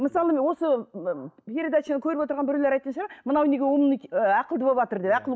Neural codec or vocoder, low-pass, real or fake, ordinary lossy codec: none; none; real; none